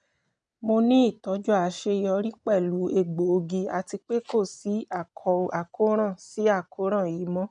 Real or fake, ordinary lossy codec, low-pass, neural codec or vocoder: real; none; 9.9 kHz; none